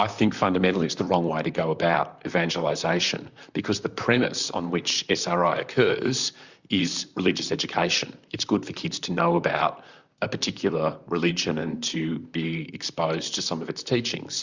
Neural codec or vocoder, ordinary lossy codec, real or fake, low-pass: vocoder, 44.1 kHz, 128 mel bands, Pupu-Vocoder; Opus, 64 kbps; fake; 7.2 kHz